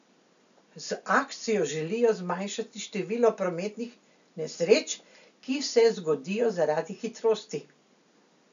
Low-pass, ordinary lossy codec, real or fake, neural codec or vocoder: 7.2 kHz; none; real; none